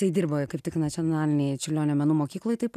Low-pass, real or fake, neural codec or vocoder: 14.4 kHz; real; none